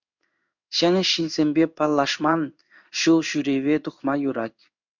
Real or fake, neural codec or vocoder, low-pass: fake; codec, 16 kHz in and 24 kHz out, 1 kbps, XY-Tokenizer; 7.2 kHz